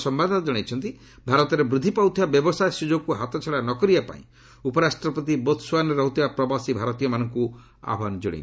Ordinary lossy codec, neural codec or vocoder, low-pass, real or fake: none; none; none; real